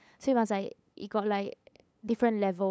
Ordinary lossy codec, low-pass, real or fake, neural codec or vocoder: none; none; fake; codec, 16 kHz, 8 kbps, FunCodec, trained on LibriTTS, 25 frames a second